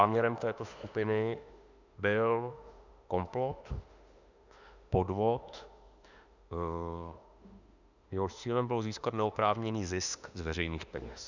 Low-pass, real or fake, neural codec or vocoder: 7.2 kHz; fake; autoencoder, 48 kHz, 32 numbers a frame, DAC-VAE, trained on Japanese speech